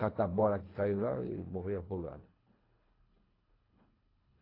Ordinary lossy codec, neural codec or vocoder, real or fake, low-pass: AAC, 24 kbps; codec, 24 kHz, 3 kbps, HILCodec; fake; 5.4 kHz